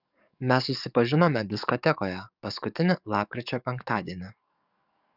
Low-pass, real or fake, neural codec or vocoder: 5.4 kHz; fake; codec, 44.1 kHz, 7.8 kbps, DAC